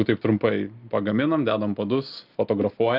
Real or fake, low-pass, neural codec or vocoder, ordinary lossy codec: real; 5.4 kHz; none; Opus, 24 kbps